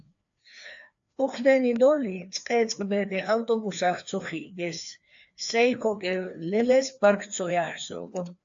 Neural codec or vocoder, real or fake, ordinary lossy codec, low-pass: codec, 16 kHz, 2 kbps, FreqCodec, larger model; fake; AAC, 64 kbps; 7.2 kHz